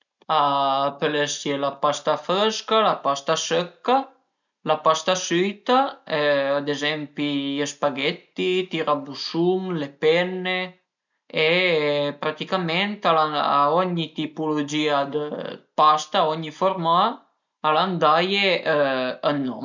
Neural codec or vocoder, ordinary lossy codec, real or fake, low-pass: none; none; real; 7.2 kHz